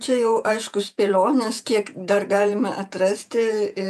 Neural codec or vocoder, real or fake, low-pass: vocoder, 48 kHz, 128 mel bands, Vocos; fake; 14.4 kHz